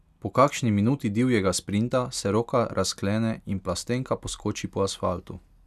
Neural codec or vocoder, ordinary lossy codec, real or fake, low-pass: none; none; real; 14.4 kHz